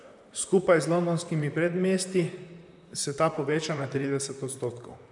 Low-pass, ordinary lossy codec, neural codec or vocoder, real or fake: 10.8 kHz; none; vocoder, 44.1 kHz, 128 mel bands, Pupu-Vocoder; fake